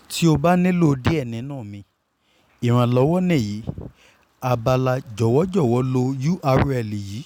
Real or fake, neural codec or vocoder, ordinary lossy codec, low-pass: real; none; none; 19.8 kHz